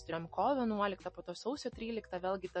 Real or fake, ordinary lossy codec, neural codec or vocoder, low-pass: real; MP3, 32 kbps; none; 10.8 kHz